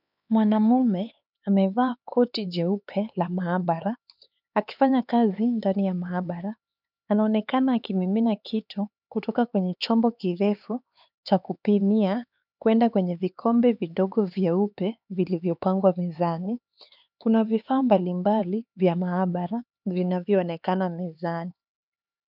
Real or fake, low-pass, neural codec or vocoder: fake; 5.4 kHz; codec, 16 kHz, 4 kbps, X-Codec, HuBERT features, trained on LibriSpeech